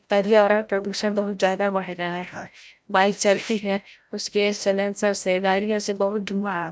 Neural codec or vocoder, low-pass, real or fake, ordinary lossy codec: codec, 16 kHz, 0.5 kbps, FreqCodec, larger model; none; fake; none